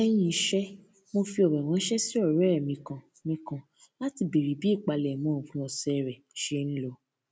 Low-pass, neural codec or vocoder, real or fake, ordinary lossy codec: none; none; real; none